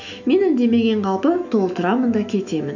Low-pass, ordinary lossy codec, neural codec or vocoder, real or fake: 7.2 kHz; none; autoencoder, 48 kHz, 128 numbers a frame, DAC-VAE, trained on Japanese speech; fake